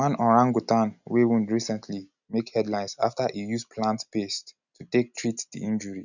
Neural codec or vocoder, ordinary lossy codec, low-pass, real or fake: none; none; 7.2 kHz; real